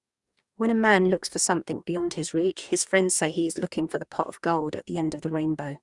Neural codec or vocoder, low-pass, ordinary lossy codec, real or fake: codec, 44.1 kHz, 2.6 kbps, DAC; 10.8 kHz; Opus, 64 kbps; fake